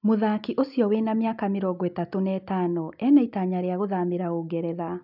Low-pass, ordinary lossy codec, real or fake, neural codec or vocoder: 5.4 kHz; none; real; none